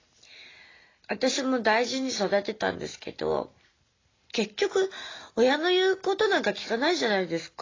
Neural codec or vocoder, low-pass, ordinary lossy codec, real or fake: none; 7.2 kHz; AAC, 32 kbps; real